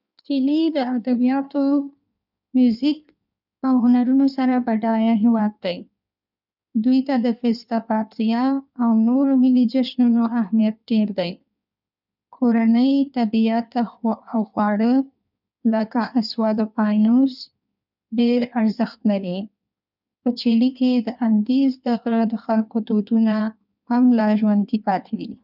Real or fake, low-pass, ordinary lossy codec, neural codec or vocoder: fake; 5.4 kHz; none; codec, 16 kHz in and 24 kHz out, 1.1 kbps, FireRedTTS-2 codec